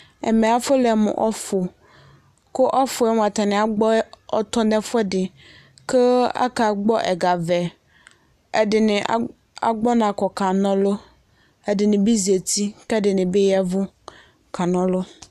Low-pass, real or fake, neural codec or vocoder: 14.4 kHz; real; none